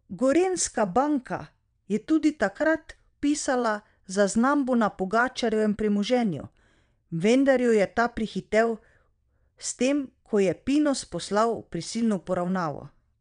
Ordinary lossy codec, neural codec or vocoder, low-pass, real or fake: none; vocoder, 22.05 kHz, 80 mel bands, WaveNeXt; 9.9 kHz; fake